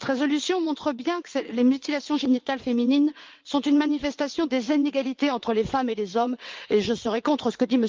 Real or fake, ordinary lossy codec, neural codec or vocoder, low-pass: fake; Opus, 32 kbps; vocoder, 22.05 kHz, 80 mel bands, WaveNeXt; 7.2 kHz